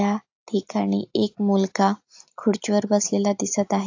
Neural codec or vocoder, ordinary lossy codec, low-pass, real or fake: none; AAC, 48 kbps; 7.2 kHz; real